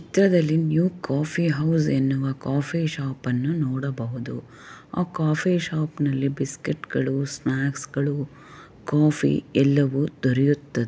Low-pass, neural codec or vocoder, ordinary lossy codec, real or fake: none; none; none; real